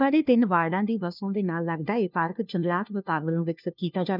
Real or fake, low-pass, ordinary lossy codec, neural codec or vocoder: fake; 5.4 kHz; none; codec, 16 kHz, 1 kbps, FunCodec, trained on LibriTTS, 50 frames a second